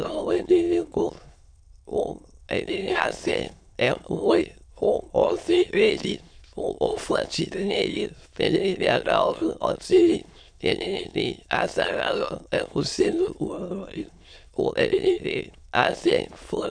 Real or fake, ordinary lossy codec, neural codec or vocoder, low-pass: fake; MP3, 96 kbps; autoencoder, 22.05 kHz, a latent of 192 numbers a frame, VITS, trained on many speakers; 9.9 kHz